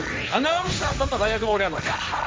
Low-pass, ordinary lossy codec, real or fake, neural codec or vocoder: none; none; fake; codec, 16 kHz, 1.1 kbps, Voila-Tokenizer